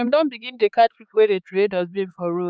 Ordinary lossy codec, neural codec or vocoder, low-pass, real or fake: none; codec, 16 kHz, 4 kbps, X-Codec, HuBERT features, trained on LibriSpeech; none; fake